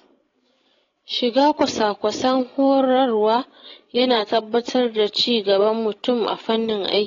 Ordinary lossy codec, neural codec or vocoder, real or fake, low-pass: AAC, 32 kbps; codec, 16 kHz, 16 kbps, FreqCodec, larger model; fake; 7.2 kHz